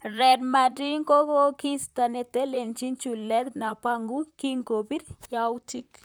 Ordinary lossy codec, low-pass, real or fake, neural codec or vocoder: none; none; fake; vocoder, 44.1 kHz, 128 mel bands, Pupu-Vocoder